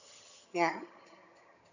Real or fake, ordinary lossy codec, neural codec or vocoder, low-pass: fake; none; vocoder, 22.05 kHz, 80 mel bands, HiFi-GAN; 7.2 kHz